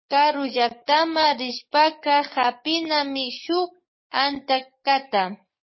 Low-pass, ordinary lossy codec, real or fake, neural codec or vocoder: 7.2 kHz; MP3, 24 kbps; fake; codec, 44.1 kHz, 7.8 kbps, DAC